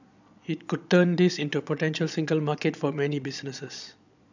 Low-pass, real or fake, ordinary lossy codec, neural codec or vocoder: 7.2 kHz; fake; none; vocoder, 22.05 kHz, 80 mel bands, Vocos